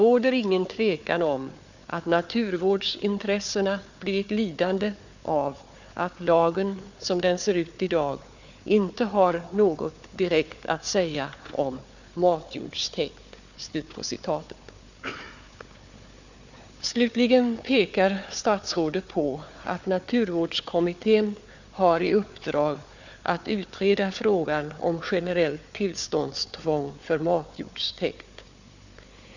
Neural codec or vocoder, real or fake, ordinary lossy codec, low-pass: codec, 16 kHz, 4 kbps, FunCodec, trained on Chinese and English, 50 frames a second; fake; none; 7.2 kHz